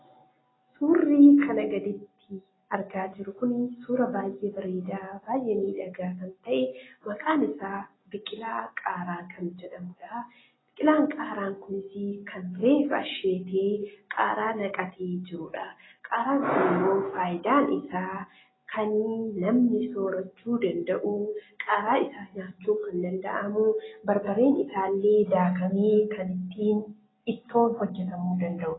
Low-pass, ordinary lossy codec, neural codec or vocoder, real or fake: 7.2 kHz; AAC, 16 kbps; none; real